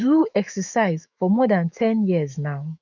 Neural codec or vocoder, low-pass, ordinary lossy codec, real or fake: autoencoder, 48 kHz, 128 numbers a frame, DAC-VAE, trained on Japanese speech; 7.2 kHz; none; fake